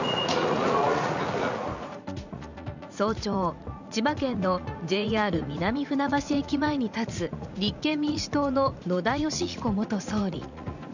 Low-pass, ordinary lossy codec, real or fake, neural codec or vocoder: 7.2 kHz; none; fake; vocoder, 44.1 kHz, 80 mel bands, Vocos